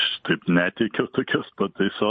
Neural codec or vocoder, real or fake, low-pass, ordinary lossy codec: vocoder, 44.1 kHz, 128 mel bands every 512 samples, BigVGAN v2; fake; 10.8 kHz; MP3, 32 kbps